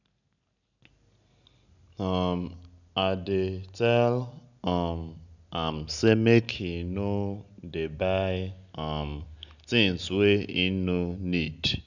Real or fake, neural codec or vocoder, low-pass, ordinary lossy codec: real; none; 7.2 kHz; none